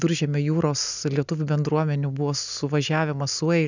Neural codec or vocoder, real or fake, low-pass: none; real; 7.2 kHz